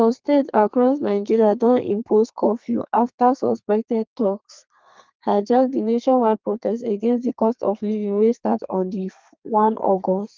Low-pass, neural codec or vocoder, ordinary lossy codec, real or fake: 7.2 kHz; codec, 32 kHz, 1.9 kbps, SNAC; Opus, 32 kbps; fake